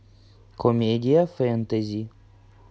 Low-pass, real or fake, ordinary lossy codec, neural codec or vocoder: none; real; none; none